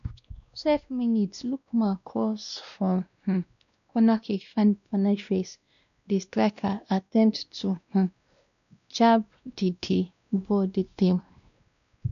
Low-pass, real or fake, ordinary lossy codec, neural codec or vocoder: 7.2 kHz; fake; none; codec, 16 kHz, 1 kbps, X-Codec, WavLM features, trained on Multilingual LibriSpeech